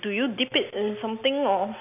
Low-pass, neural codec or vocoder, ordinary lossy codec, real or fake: 3.6 kHz; none; none; real